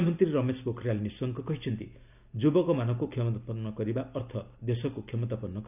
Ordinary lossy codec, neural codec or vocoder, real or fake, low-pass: none; none; real; 3.6 kHz